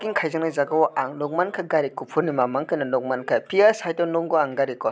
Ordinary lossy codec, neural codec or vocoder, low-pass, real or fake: none; none; none; real